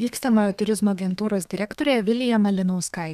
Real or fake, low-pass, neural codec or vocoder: fake; 14.4 kHz; codec, 32 kHz, 1.9 kbps, SNAC